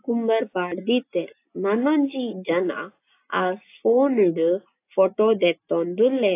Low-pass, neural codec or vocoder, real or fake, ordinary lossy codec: 3.6 kHz; none; real; AAC, 24 kbps